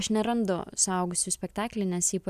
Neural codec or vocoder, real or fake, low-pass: none; real; 14.4 kHz